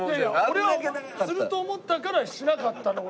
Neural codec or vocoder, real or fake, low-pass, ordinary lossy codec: none; real; none; none